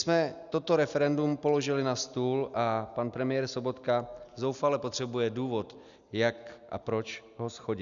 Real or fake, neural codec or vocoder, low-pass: real; none; 7.2 kHz